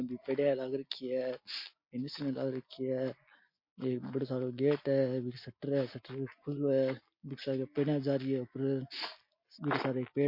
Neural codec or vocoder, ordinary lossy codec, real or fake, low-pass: none; MP3, 32 kbps; real; 5.4 kHz